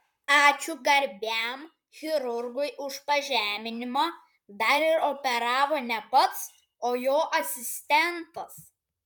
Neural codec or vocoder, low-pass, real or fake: vocoder, 44.1 kHz, 128 mel bands, Pupu-Vocoder; 19.8 kHz; fake